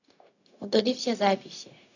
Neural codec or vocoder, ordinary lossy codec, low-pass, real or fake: codec, 16 kHz, 0.4 kbps, LongCat-Audio-Codec; none; 7.2 kHz; fake